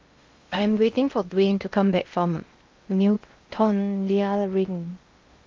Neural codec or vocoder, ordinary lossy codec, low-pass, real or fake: codec, 16 kHz in and 24 kHz out, 0.8 kbps, FocalCodec, streaming, 65536 codes; Opus, 32 kbps; 7.2 kHz; fake